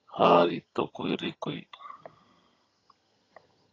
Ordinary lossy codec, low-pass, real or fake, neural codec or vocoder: AAC, 32 kbps; 7.2 kHz; fake; vocoder, 22.05 kHz, 80 mel bands, HiFi-GAN